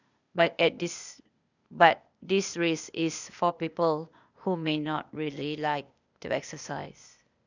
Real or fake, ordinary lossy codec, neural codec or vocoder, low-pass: fake; none; codec, 16 kHz, 0.8 kbps, ZipCodec; 7.2 kHz